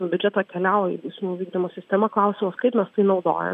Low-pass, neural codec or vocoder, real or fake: 14.4 kHz; none; real